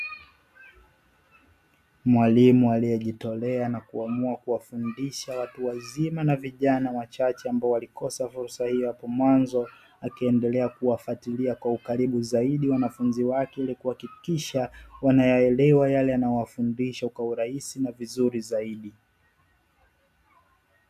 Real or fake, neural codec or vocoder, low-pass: real; none; 14.4 kHz